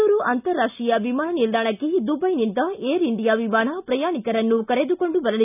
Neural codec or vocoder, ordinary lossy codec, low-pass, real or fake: none; none; 3.6 kHz; real